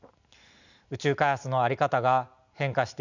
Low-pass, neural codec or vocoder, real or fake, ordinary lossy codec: 7.2 kHz; none; real; none